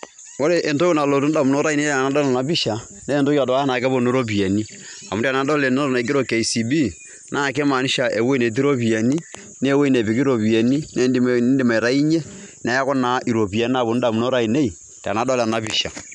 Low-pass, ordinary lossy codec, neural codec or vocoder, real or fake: 10.8 kHz; none; none; real